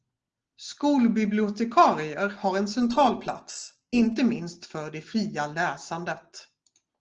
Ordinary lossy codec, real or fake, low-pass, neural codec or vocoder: Opus, 16 kbps; real; 7.2 kHz; none